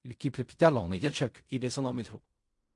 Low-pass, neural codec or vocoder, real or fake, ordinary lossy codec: 10.8 kHz; codec, 16 kHz in and 24 kHz out, 0.4 kbps, LongCat-Audio-Codec, fine tuned four codebook decoder; fake; MP3, 64 kbps